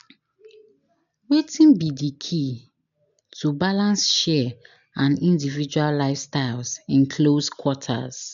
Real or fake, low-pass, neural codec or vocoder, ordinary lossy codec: real; 7.2 kHz; none; none